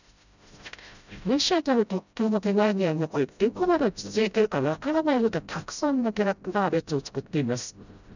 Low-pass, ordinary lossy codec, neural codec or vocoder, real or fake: 7.2 kHz; none; codec, 16 kHz, 0.5 kbps, FreqCodec, smaller model; fake